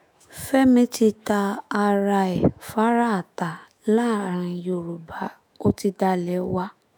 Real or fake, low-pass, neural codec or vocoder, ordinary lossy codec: fake; none; autoencoder, 48 kHz, 128 numbers a frame, DAC-VAE, trained on Japanese speech; none